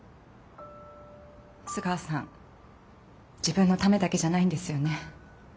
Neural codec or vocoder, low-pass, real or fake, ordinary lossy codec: none; none; real; none